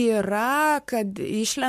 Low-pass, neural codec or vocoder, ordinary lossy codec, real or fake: 14.4 kHz; codec, 44.1 kHz, 7.8 kbps, Pupu-Codec; MP3, 64 kbps; fake